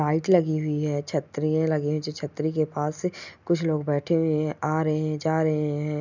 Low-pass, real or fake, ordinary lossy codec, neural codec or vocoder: 7.2 kHz; real; none; none